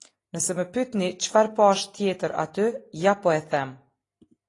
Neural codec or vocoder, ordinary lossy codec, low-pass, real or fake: none; AAC, 32 kbps; 10.8 kHz; real